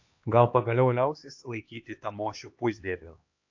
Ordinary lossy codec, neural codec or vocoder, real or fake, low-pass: AAC, 48 kbps; codec, 16 kHz, 2 kbps, X-Codec, HuBERT features, trained on LibriSpeech; fake; 7.2 kHz